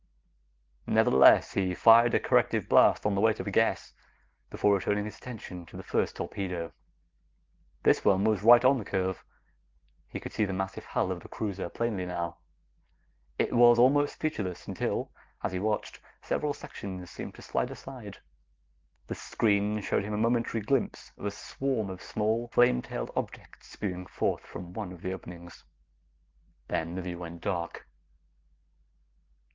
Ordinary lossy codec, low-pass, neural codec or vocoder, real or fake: Opus, 16 kbps; 7.2 kHz; none; real